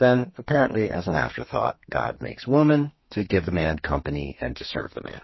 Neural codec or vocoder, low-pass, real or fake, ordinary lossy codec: codec, 32 kHz, 1.9 kbps, SNAC; 7.2 kHz; fake; MP3, 24 kbps